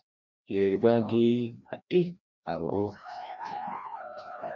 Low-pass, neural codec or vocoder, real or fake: 7.2 kHz; codec, 16 kHz, 1 kbps, FreqCodec, larger model; fake